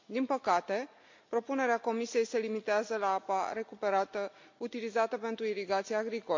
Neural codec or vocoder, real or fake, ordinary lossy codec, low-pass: none; real; MP3, 48 kbps; 7.2 kHz